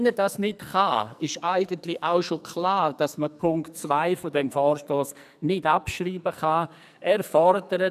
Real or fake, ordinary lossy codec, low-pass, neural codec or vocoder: fake; none; 14.4 kHz; codec, 44.1 kHz, 2.6 kbps, SNAC